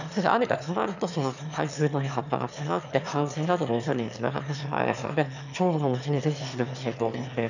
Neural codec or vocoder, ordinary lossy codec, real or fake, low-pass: autoencoder, 22.05 kHz, a latent of 192 numbers a frame, VITS, trained on one speaker; none; fake; 7.2 kHz